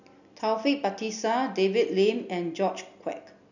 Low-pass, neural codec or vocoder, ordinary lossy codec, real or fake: 7.2 kHz; none; none; real